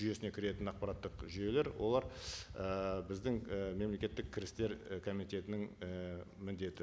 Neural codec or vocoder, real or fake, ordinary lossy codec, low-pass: none; real; none; none